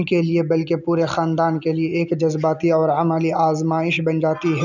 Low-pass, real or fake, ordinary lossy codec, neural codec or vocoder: 7.2 kHz; real; none; none